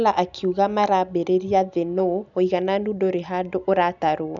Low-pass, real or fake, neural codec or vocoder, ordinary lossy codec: 7.2 kHz; real; none; none